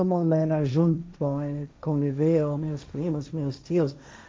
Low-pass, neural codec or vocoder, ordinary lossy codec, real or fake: none; codec, 16 kHz, 1.1 kbps, Voila-Tokenizer; none; fake